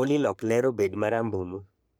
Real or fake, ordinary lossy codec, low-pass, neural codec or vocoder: fake; none; none; codec, 44.1 kHz, 3.4 kbps, Pupu-Codec